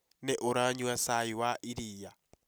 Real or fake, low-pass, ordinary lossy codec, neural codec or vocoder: real; none; none; none